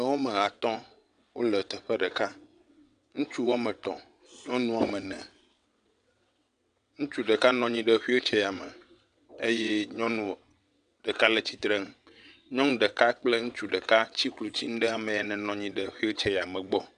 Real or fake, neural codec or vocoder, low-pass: fake; vocoder, 22.05 kHz, 80 mel bands, WaveNeXt; 9.9 kHz